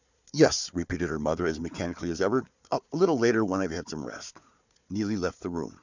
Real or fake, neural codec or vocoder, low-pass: fake; codec, 16 kHz, 4 kbps, FunCodec, trained on Chinese and English, 50 frames a second; 7.2 kHz